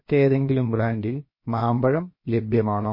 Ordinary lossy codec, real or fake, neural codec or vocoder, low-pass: MP3, 24 kbps; fake; codec, 16 kHz, about 1 kbps, DyCAST, with the encoder's durations; 5.4 kHz